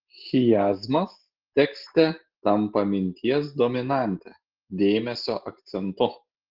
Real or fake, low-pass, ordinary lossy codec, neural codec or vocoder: real; 5.4 kHz; Opus, 16 kbps; none